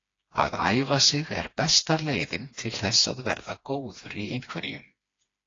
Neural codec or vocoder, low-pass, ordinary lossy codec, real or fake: codec, 16 kHz, 2 kbps, FreqCodec, smaller model; 7.2 kHz; AAC, 32 kbps; fake